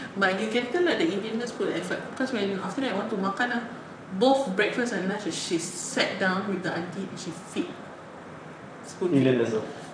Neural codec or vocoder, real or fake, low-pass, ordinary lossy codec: vocoder, 44.1 kHz, 128 mel bands, Pupu-Vocoder; fake; 9.9 kHz; none